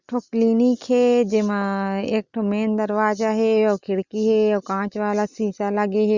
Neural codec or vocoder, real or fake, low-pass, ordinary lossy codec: none; real; 7.2 kHz; Opus, 64 kbps